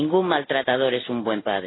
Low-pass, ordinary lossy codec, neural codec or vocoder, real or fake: 7.2 kHz; AAC, 16 kbps; none; real